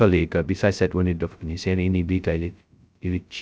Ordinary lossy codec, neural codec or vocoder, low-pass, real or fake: none; codec, 16 kHz, 0.2 kbps, FocalCodec; none; fake